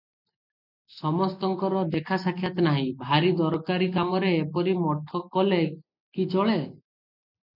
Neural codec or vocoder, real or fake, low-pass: none; real; 5.4 kHz